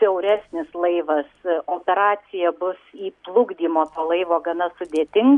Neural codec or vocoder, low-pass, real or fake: none; 10.8 kHz; real